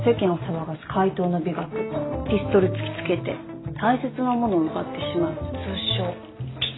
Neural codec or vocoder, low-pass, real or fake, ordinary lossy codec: none; 7.2 kHz; real; AAC, 16 kbps